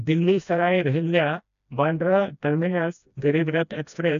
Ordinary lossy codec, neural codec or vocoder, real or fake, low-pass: none; codec, 16 kHz, 1 kbps, FreqCodec, smaller model; fake; 7.2 kHz